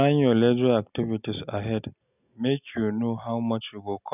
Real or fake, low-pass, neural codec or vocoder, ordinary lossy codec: real; 3.6 kHz; none; none